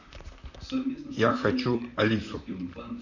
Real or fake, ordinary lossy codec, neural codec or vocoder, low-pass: fake; none; vocoder, 44.1 kHz, 128 mel bands, Pupu-Vocoder; 7.2 kHz